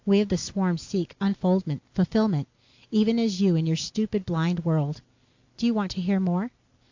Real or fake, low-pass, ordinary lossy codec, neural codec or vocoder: real; 7.2 kHz; AAC, 48 kbps; none